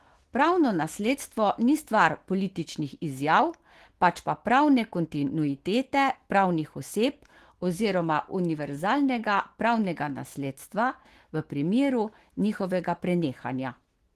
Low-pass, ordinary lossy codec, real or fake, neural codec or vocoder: 14.4 kHz; Opus, 16 kbps; fake; autoencoder, 48 kHz, 128 numbers a frame, DAC-VAE, trained on Japanese speech